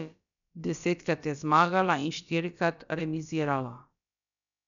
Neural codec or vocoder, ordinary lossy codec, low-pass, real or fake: codec, 16 kHz, about 1 kbps, DyCAST, with the encoder's durations; none; 7.2 kHz; fake